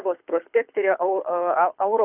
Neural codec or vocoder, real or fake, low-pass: codec, 24 kHz, 6 kbps, HILCodec; fake; 3.6 kHz